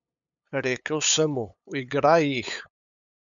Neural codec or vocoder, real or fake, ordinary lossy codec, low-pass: codec, 16 kHz, 8 kbps, FunCodec, trained on LibriTTS, 25 frames a second; fake; none; 7.2 kHz